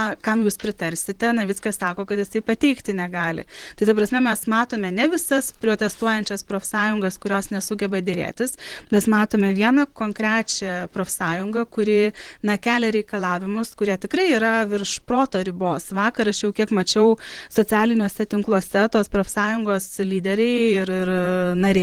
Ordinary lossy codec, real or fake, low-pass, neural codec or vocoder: Opus, 16 kbps; fake; 19.8 kHz; vocoder, 44.1 kHz, 128 mel bands, Pupu-Vocoder